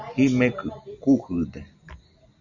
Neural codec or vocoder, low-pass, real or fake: none; 7.2 kHz; real